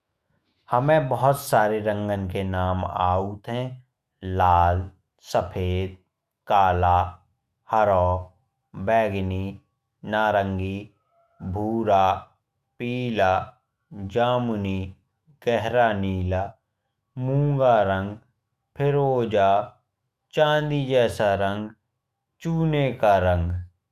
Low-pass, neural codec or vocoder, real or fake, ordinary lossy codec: 14.4 kHz; autoencoder, 48 kHz, 128 numbers a frame, DAC-VAE, trained on Japanese speech; fake; Opus, 64 kbps